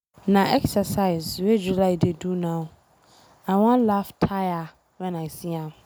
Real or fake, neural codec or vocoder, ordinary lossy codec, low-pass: real; none; none; none